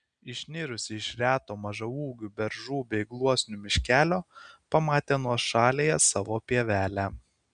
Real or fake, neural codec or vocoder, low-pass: real; none; 9.9 kHz